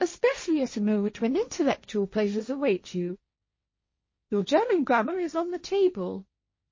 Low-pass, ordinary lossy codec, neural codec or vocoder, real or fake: 7.2 kHz; MP3, 32 kbps; codec, 16 kHz, 1.1 kbps, Voila-Tokenizer; fake